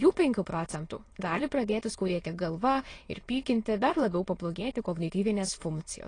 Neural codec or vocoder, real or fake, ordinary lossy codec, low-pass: autoencoder, 22.05 kHz, a latent of 192 numbers a frame, VITS, trained on many speakers; fake; AAC, 32 kbps; 9.9 kHz